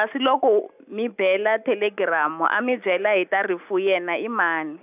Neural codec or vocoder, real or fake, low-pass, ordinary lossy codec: none; real; 3.6 kHz; none